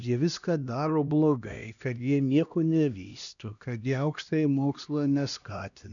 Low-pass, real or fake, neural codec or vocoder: 7.2 kHz; fake; codec, 16 kHz, 1 kbps, X-Codec, HuBERT features, trained on LibriSpeech